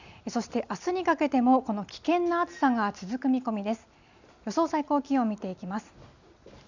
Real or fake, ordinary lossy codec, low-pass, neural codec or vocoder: real; none; 7.2 kHz; none